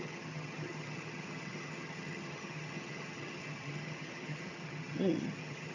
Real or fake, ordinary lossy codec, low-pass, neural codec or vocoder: fake; MP3, 64 kbps; 7.2 kHz; vocoder, 22.05 kHz, 80 mel bands, HiFi-GAN